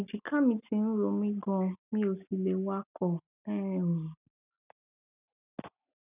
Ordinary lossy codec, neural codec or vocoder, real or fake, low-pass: none; none; real; 3.6 kHz